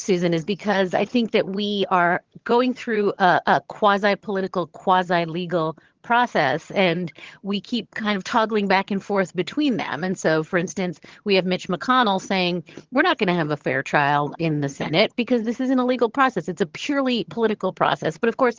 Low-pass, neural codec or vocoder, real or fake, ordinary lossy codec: 7.2 kHz; vocoder, 22.05 kHz, 80 mel bands, HiFi-GAN; fake; Opus, 16 kbps